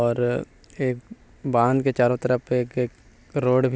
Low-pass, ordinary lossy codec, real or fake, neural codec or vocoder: none; none; real; none